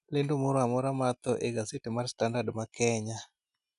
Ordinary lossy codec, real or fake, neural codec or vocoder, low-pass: none; real; none; 10.8 kHz